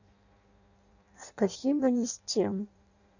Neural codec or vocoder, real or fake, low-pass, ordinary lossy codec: codec, 16 kHz in and 24 kHz out, 0.6 kbps, FireRedTTS-2 codec; fake; 7.2 kHz; none